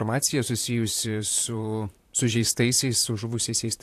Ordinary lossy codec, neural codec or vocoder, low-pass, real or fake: AAC, 64 kbps; vocoder, 44.1 kHz, 128 mel bands, Pupu-Vocoder; 14.4 kHz; fake